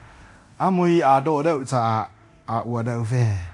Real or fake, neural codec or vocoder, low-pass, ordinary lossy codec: fake; codec, 24 kHz, 0.9 kbps, DualCodec; 10.8 kHz; AAC, 64 kbps